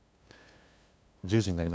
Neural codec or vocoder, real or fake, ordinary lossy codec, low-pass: codec, 16 kHz, 2 kbps, FunCodec, trained on LibriTTS, 25 frames a second; fake; none; none